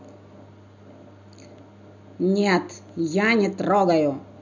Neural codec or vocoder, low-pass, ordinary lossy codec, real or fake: none; 7.2 kHz; none; real